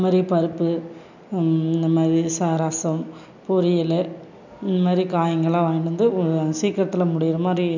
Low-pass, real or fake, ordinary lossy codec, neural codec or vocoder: 7.2 kHz; real; none; none